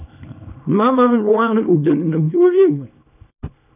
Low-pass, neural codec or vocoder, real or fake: 3.6 kHz; codec, 24 kHz, 0.9 kbps, WavTokenizer, small release; fake